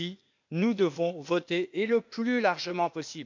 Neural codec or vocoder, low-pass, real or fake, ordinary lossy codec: codec, 16 kHz, 0.8 kbps, ZipCodec; 7.2 kHz; fake; MP3, 64 kbps